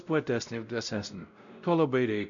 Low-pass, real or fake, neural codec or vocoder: 7.2 kHz; fake; codec, 16 kHz, 0.5 kbps, X-Codec, WavLM features, trained on Multilingual LibriSpeech